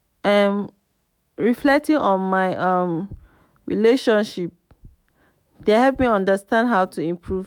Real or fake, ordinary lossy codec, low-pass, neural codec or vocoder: fake; MP3, 96 kbps; 19.8 kHz; autoencoder, 48 kHz, 128 numbers a frame, DAC-VAE, trained on Japanese speech